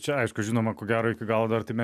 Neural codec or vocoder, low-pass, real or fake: none; 14.4 kHz; real